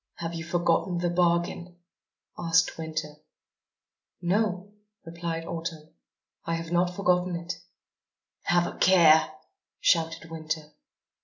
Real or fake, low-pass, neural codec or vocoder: real; 7.2 kHz; none